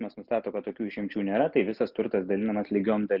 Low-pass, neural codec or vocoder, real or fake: 5.4 kHz; none; real